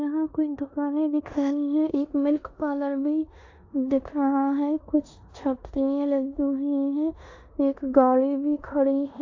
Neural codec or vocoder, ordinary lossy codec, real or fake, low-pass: codec, 16 kHz in and 24 kHz out, 0.9 kbps, LongCat-Audio-Codec, four codebook decoder; AAC, 48 kbps; fake; 7.2 kHz